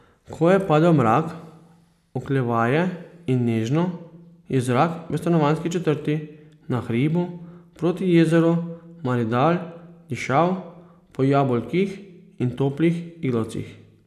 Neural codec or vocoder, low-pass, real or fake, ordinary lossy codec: none; 14.4 kHz; real; none